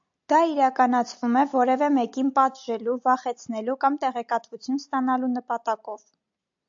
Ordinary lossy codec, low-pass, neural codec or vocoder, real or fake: AAC, 64 kbps; 7.2 kHz; none; real